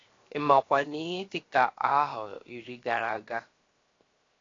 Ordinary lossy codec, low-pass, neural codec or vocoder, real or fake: AAC, 32 kbps; 7.2 kHz; codec, 16 kHz, 0.7 kbps, FocalCodec; fake